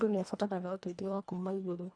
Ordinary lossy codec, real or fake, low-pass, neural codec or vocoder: none; fake; 9.9 kHz; codec, 24 kHz, 1.5 kbps, HILCodec